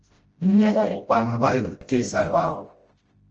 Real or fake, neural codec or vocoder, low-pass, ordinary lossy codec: fake; codec, 16 kHz, 0.5 kbps, FreqCodec, smaller model; 7.2 kHz; Opus, 24 kbps